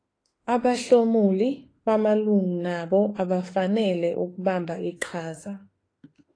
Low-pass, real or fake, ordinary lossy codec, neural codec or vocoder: 9.9 kHz; fake; AAC, 32 kbps; autoencoder, 48 kHz, 32 numbers a frame, DAC-VAE, trained on Japanese speech